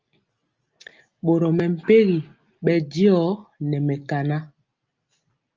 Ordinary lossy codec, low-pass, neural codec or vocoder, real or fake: Opus, 24 kbps; 7.2 kHz; none; real